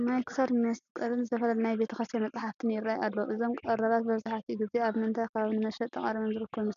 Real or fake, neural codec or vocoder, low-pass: real; none; 7.2 kHz